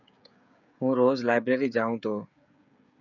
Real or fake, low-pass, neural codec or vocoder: fake; 7.2 kHz; codec, 16 kHz, 16 kbps, FreqCodec, smaller model